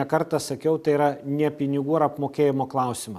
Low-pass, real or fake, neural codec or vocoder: 14.4 kHz; real; none